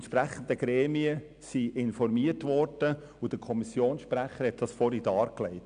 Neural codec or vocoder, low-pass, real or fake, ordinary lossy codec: none; 9.9 kHz; real; none